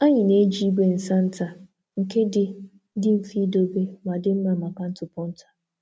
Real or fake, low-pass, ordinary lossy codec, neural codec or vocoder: real; none; none; none